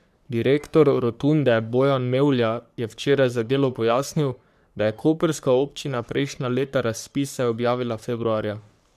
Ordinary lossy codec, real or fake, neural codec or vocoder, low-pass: none; fake; codec, 44.1 kHz, 3.4 kbps, Pupu-Codec; 14.4 kHz